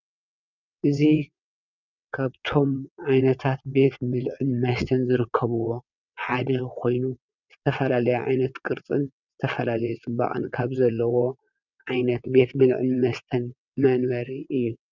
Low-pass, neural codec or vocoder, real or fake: 7.2 kHz; vocoder, 22.05 kHz, 80 mel bands, WaveNeXt; fake